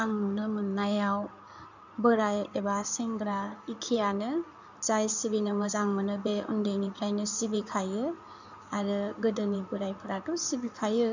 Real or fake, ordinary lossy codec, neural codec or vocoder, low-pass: fake; none; codec, 16 kHz in and 24 kHz out, 2.2 kbps, FireRedTTS-2 codec; 7.2 kHz